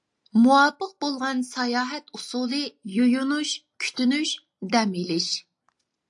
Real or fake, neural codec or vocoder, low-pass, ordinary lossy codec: real; none; 10.8 kHz; AAC, 64 kbps